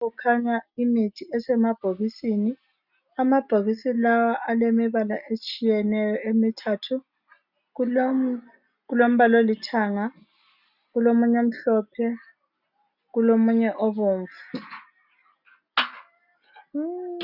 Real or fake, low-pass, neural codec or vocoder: real; 5.4 kHz; none